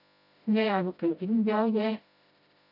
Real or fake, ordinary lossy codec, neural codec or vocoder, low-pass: fake; AAC, 48 kbps; codec, 16 kHz, 0.5 kbps, FreqCodec, smaller model; 5.4 kHz